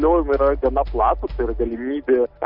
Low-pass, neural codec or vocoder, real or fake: 5.4 kHz; none; real